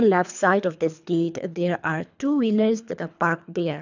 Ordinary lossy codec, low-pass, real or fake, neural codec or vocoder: none; 7.2 kHz; fake; codec, 24 kHz, 3 kbps, HILCodec